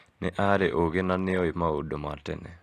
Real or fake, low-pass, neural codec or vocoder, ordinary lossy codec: real; 14.4 kHz; none; AAC, 48 kbps